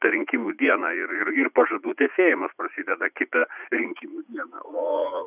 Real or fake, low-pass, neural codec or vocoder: fake; 3.6 kHz; vocoder, 44.1 kHz, 80 mel bands, Vocos